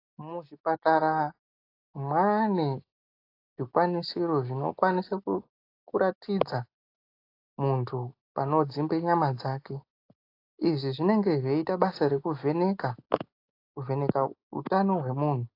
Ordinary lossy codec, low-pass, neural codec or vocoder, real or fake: AAC, 32 kbps; 5.4 kHz; none; real